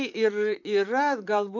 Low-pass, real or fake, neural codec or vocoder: 7.2 kHz; fake; vocoder, 44.1 kHz, 128 mel bands, Pupu-Vocoder